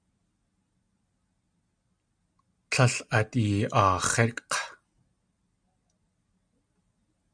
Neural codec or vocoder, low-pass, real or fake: none; 9.9 kHz; real